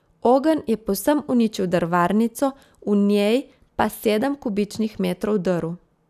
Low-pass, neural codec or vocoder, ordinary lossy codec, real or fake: 14.4 kHz; none; none; real